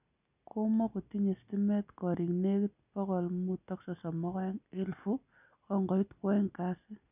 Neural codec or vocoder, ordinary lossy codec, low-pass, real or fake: none; none; 3.6 kHz; real